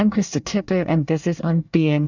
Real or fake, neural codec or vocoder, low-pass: fake; codec, 24 kHz, 1 kbps, SNAC; 7.2 kHz